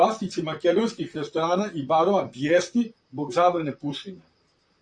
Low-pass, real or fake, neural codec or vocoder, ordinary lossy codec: 9.9 kHz; fake; vocoder, 44.1 kHz, 128 mel bands, Pupu-Vocoder; MP3, 64 kbps